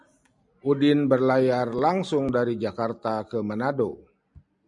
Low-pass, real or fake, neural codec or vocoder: 10.8 kHz; real; none